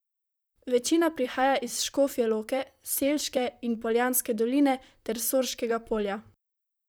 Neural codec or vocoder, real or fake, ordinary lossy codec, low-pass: vocoder, 44.1 kHz, 128 mel bands, Pupu-Vocoder; fake; none; none